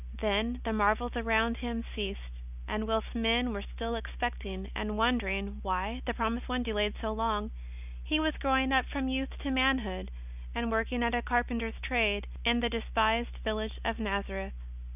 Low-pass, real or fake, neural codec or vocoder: 3.6 kHz; real; none